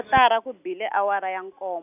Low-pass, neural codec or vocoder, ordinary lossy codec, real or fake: 3.6 kHz; none; none; real